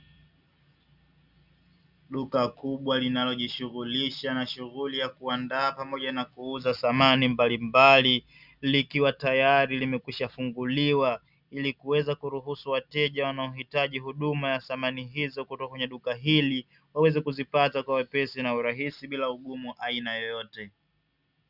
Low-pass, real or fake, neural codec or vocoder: 5.4 kHz; real; none